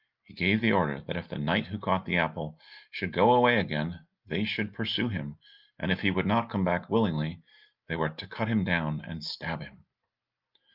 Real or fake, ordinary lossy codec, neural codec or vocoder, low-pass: real; Opus, 24 kbps; none; 5.4 kHz